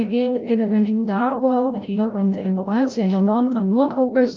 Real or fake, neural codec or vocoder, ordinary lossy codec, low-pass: fake; codec, 16 kHz, 0.5 kbps, FreqCodec, larger model; Opus, 24 kbps; 7.2 kHz